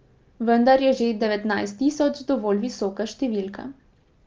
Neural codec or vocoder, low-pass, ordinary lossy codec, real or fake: none; 7.2 kHz; Opus, 24 kbps; real